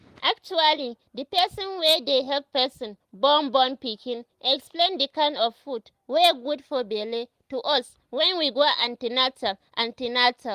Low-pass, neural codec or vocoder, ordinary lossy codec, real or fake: 14.4 kHz; none; Opus, 24 kbps; real